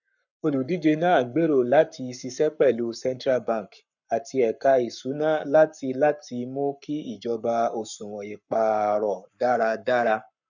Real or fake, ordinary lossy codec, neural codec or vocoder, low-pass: fake; none; codec, 44.1 kHz, 7.8 kbps, Pupu-Codec; 7.2 kHz